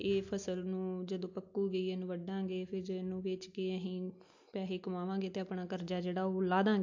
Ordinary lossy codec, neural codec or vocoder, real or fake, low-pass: AAC, 48 kbps; none; real; 7.2 kHz